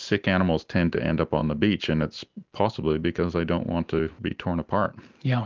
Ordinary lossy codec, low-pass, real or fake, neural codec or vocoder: Opus, 32 kbps; 7.2 kHz; real; none